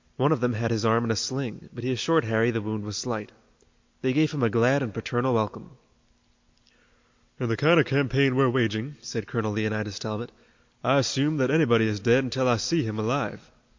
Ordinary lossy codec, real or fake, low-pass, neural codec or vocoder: MP3, 48 kbps; real; 7.2 kHz; none